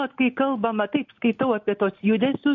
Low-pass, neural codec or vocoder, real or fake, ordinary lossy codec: 7.2 kHz; none; real; MP3, 48 kbps